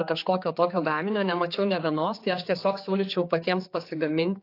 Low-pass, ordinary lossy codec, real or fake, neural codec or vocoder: 5.4 kHz; AAC, 32 kbps; fake; codec, 16 kHz, 4 kbps, X-Codec, HuBERT features, trained on general audio